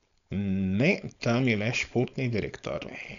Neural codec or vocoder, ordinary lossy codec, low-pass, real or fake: codec, 16 kHz, 4.8 kbps, FACodec; none; 7.2 kHz; fake